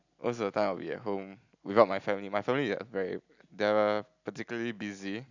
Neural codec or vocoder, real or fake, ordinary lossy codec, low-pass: none; real; none; 7.2 kHz